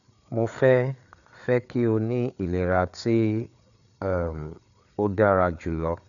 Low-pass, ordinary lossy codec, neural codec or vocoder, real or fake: 7.2 kHz; none; codec, 16 kHz, 4 kbps, FreqCodec, larger model; fake